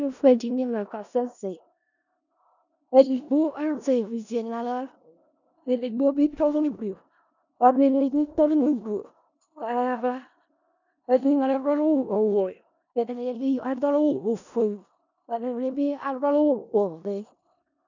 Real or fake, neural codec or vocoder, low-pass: fake; codec, 16 kHz in and 24 kHz out, 0.4 kbps, LongCat-Audio-Codec, four codebook decoder; 7.2 kHz